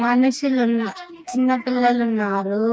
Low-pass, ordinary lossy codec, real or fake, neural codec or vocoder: none; none; fake; codec, 16 kHz, 2 kbps, FreqCodec, smaller model